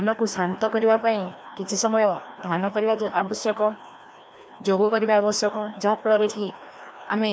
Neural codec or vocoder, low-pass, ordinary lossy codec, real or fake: codec, 16 kHz, 1 kbps, FreqCodec, larger model; none; none; fake